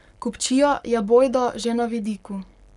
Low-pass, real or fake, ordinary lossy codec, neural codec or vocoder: 10.8 kHz; fake; none; codec, 44.1 kHz, 7.8 kbps, Pupu-Codec